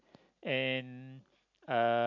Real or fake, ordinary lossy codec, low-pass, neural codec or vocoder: real; MP3, 64 kbps; 7.2 kHz; none